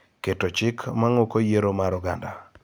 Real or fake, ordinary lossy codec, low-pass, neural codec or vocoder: real; none; none; none